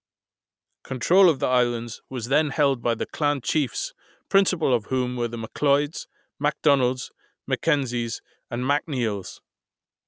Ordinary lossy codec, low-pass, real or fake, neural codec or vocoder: none; none; real; none